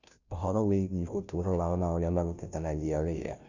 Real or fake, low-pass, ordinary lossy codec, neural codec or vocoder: fake; 7.2 kHz; none; codec, 16 kHz, 0.5 kbps, FunCodec, trained on Chinese and English, 25 frames a second